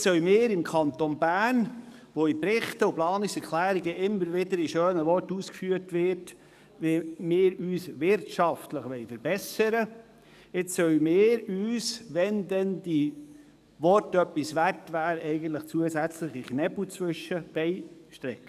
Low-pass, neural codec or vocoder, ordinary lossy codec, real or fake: 14.4 kHz; codec, 44.1 kHz, 7.8 kbps, DAC; none; fake